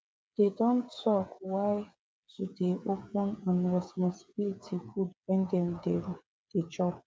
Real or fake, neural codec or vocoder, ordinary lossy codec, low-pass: fake; codec, 16 kHz, 8 kbps, FreqCodec, smaller model; none; none